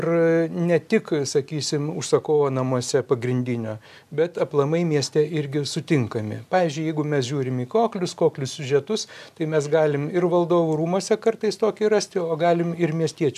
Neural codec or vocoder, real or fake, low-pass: none; real; 14.4 kHz